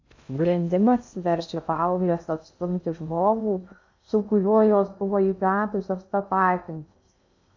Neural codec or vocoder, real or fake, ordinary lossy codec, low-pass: codec, 16 kHz in and 24 kHz out, 0.6 kbps, FocalCodec, streaming, 2048 codes; fake; AAC, 48 kbps; 7.2 kHz